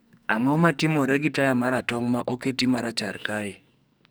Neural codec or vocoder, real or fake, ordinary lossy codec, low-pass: codec, 44.1 kHz, 2.6 kbps, SNAC; fake; none; none